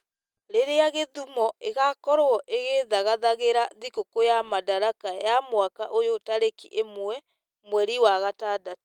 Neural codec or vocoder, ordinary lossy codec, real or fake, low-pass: none; Opus, 32 kbps; real; 19.8 kHz